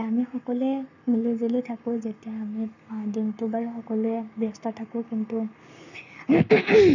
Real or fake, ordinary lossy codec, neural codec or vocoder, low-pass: fake; none; codec, 44.1 kHz, 7.8 kbps, Pupu-Codec; 7.2 kHz